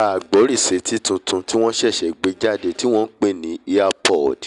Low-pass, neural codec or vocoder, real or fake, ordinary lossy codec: 10.8 kHz; none; real; none